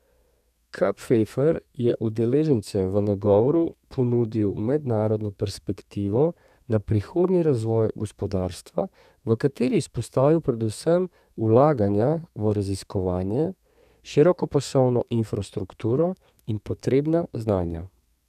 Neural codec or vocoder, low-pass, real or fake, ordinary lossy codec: codec, 32 kHz, 1.9 kbps, SNAC; 14.4 kHz; fake; none